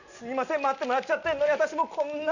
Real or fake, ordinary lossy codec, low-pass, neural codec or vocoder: real; none; 7.2 kHz; none